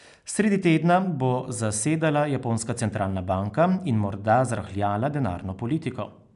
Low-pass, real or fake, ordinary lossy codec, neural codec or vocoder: 10.8 kHz; real; none; none